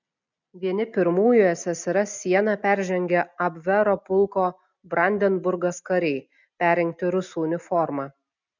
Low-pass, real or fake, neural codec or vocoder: 7.2 kHz; real; none